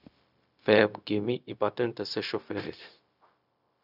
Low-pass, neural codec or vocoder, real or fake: 5.4 kHz; codec, 16 kHz, 0.4 kbps, LongCat-Audio-Codec; fake